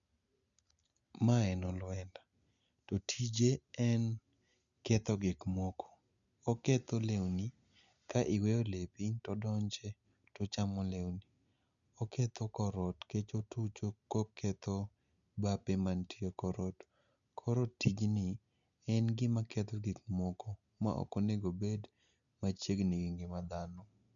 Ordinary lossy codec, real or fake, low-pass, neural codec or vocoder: none; real; 7.2 kHz; none